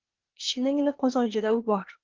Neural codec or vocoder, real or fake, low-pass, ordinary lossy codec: codec, 16 kHz, 0.8 kbps, ZipCodec; fake; 7.2 kHz; Opus, 16 kbps